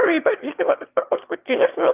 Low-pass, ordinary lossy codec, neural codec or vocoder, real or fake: 3.6 kHz; Opus, 24 kbps; autoencoder, 22.05 kHz, a latent of 192 numbers a frame, VITS, trained on one speaker; fake